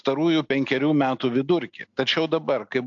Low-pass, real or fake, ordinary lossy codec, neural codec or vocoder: 7.2 kHz; real; AAC, 64 kbps; none